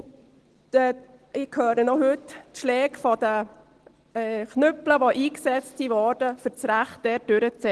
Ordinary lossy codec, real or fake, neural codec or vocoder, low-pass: Opus, 16 kbps; real; none; 10.8 kHz